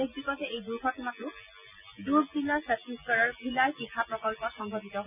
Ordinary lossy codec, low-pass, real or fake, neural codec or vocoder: none; 3.6 kHz; real; none